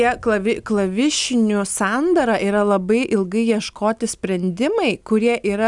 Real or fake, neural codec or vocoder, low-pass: real; none; 10.8 kHz